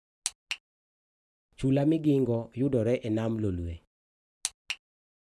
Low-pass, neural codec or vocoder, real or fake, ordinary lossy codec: none; none; real; none